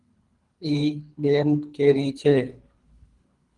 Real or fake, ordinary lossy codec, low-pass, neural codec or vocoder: fake; Opus, 24 kbps; 10.8 kHz; codec, 24 kHz, 3 kbps, HILCodec